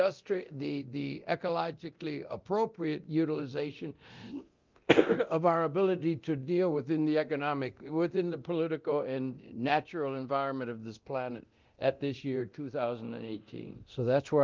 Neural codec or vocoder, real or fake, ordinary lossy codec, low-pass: codec, 24 kHz, 0.9 kbps, DualCodec; fake; Opus, 32 kbps; 7.2 kHz